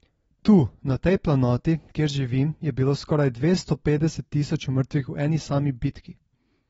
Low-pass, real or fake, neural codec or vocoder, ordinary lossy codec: 19.8 kHz; real; none; AAC, 24 kbps